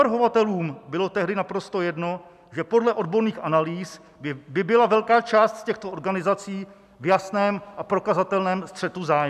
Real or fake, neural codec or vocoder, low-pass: real; none; 14.4 kHz